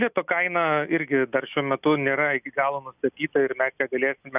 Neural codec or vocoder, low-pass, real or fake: none; 3.6 kHz; real